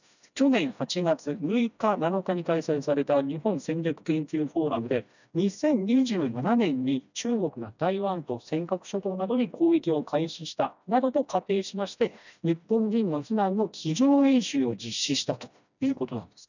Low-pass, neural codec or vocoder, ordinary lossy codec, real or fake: 7.2 kHz; codec, 16 kHz, 1 kbps, FreqCodec, smaller model; none; fake